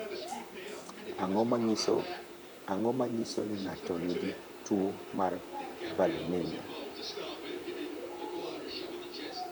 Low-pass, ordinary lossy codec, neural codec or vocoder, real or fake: none; none; vocoder, 44.1 kHz, 128 mel bands, Pupu-Vocoder; fake